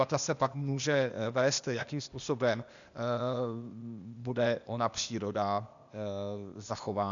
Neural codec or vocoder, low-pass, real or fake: codec, 16 kHz, 0.8 kbps, ZipCodec; 7.2 kHz; fake